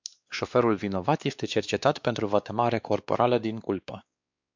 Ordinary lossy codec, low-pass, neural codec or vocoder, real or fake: MP3, 64 kbps; 7.2 kHz; codec, 16 kHz, 2 kbps, X-Codec, WavLM features, trained on Multilingual LibriSpeech; fake